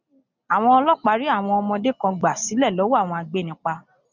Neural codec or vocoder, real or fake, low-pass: none; real; 7.2 kHz